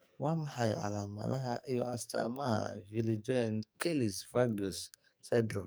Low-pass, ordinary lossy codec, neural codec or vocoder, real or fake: none; none; codec, 44.1 kHz, 2.6 kbps, SNAC; fake